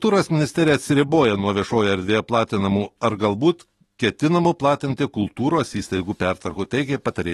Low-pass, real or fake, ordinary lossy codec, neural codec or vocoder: 19.8 kHz; fake; AAC, 32 kbps; autoencoder, 48 kHz, 128 numbers a frame, DAC-VAE, trained on Japanese speech